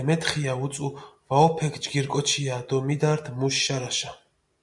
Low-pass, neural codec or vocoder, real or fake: 10.8 kHz; none; real